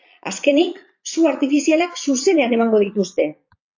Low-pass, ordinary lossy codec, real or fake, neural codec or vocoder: 7.2 kHz; AAC, 48 kbps; fake; vocoder, 22.05 kHz, 80 mel bands, Vocos